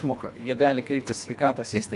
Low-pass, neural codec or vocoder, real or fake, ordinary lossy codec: 10.8 kHz; codec, 24 kHz, 1.5 kbps, HILCodec; fake; MP3, 96 kbps